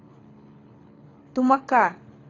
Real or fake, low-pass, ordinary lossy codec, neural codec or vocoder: fake; 7.2 kHz; AAC, 32 kbps; codec, 24 kHz, 6 kbps, HILCodec